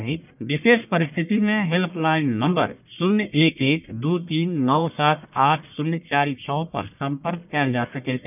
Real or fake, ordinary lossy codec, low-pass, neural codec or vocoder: fake; none; 3.6 kHz; codec, 44.1 kHz, 1.7 kbps, Pupu-Codec